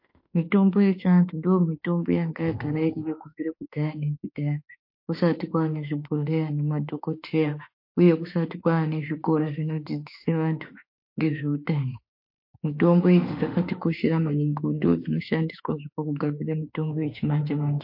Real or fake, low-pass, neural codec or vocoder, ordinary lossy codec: fake; 5.4 kHz; autoencoder, 48 kHz, 32 numbers a frame, DAC-VAE, trained on Japanese speech; MP3, 32 kbps